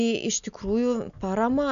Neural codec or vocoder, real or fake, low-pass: none; real; 7.2 kHz